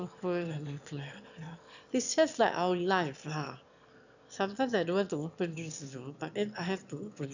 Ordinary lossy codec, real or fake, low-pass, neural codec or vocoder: none; fake; 7.2 kHz; autoencoder, 22.05 kHz, a latent of 192 numbers a frame, VITS, trained on one speaker